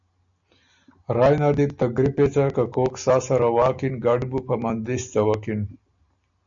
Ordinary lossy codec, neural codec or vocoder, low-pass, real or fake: MP3, 64 kbps; none; 7.2 kHz; real